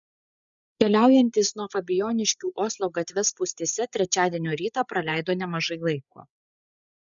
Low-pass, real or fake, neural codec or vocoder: 7.2 kHz; fake; codec, 16 kHz, 8 kbps, FreqCodec, larger model